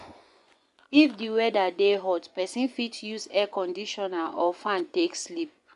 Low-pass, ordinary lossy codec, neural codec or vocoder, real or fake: 10.8 kHz; AAC, 96 kbps; vocoder, 24 kHz, 100 mel bands, Vocos; fake